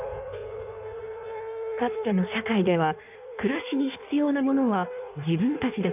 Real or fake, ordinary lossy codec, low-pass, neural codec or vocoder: fake; none; 3.6 kHz; codec, 16 kHz in and 24 kHz out, 1.1 kbps, FireRedTTS-2 codec